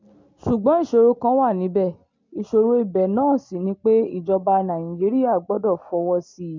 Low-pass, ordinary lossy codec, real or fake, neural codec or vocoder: 7.2 kHz; MP3, 48 kbps; real; none